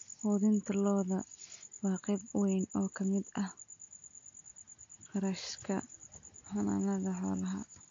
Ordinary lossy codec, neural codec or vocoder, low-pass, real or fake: AAC, 48 kbps; none; 7.2 kHz; real